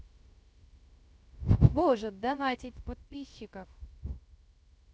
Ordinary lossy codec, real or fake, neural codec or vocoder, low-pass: none; fake; codec, 16 kHz, 0.3 kbps, FocalCodec; none